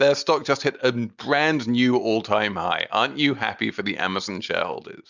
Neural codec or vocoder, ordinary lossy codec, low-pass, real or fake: none; Opus, 64 kbps; 7.2 kHz; real